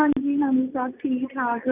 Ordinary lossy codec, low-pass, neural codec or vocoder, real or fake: none; 3.6 kHz; none; real